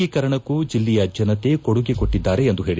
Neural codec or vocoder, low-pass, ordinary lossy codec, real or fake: none; none; none; real